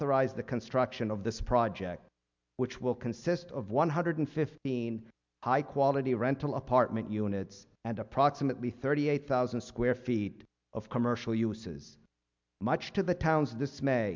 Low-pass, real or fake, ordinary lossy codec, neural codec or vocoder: 7.2 kHz; real; MP3, 64 kbps; none